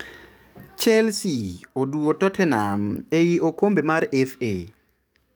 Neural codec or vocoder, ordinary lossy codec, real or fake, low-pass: codec, 44.1 kHz, 7.8 kbps, DAC; none; fake; none